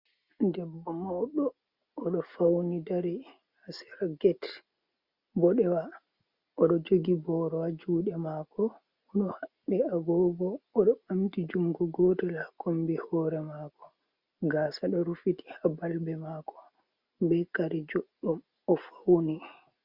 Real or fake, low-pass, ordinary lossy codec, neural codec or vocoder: real; 5.4 kHz; Opus, 64 kbps; none